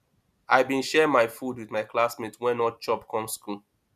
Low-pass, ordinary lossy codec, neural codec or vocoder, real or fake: 14.4 kHz; none; none; real